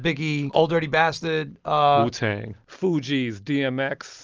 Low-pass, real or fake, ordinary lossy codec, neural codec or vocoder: 7.2 kHz; real; Opus, 24 kbps; none